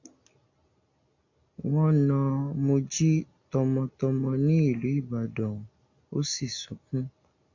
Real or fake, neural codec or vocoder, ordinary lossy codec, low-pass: real; none; AAC, 48 kbps; 7.2 kHz